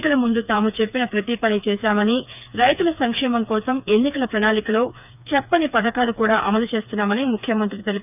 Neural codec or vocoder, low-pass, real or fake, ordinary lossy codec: codec, 16 kHz, 4 kbps, FreqCodec, smaller model; 3.6 kHz; fake; none